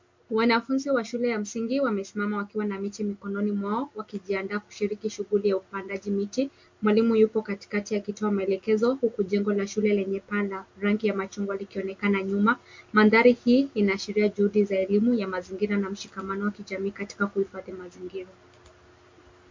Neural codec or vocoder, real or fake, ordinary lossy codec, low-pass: none; real; MP3, 48 kbps; 7.2 kHz